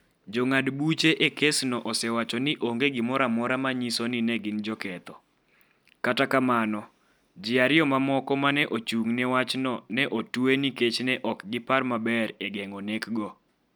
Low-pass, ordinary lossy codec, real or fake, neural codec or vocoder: none; none; real; none